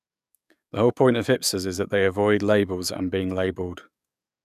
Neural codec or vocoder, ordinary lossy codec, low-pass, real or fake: codec, 44.1 kHz, 7.8 kbps, DAC; none; 14.4 kHz; fake